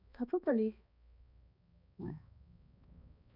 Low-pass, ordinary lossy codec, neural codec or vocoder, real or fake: 5.4 kHz; none; codec, 16 kHz, 2 kbps, X-Codec, HuBERT features, trained on balanced general audio; fake